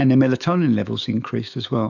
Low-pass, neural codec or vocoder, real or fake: 7.2 kHz; autoencoder, 48 kHz, 128 numbers a frame, DAC-VAE, trained on Japanese speech; fake